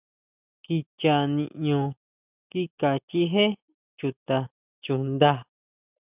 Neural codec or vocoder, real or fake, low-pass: none; real; 3.6 kHz